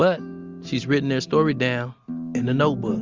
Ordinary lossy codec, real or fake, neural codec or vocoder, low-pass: Opus, 32 kbps; real; none; 7.2 kHz